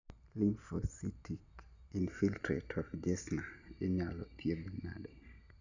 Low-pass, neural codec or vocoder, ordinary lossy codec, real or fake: 7.2 kHz; none; none; real